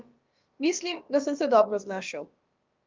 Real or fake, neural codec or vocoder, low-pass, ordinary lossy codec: fake; codec, 16 kHz, about 1 kbps, DyCAST, with the encoder's durations; 7.2 kHz; Opus, 24 kbps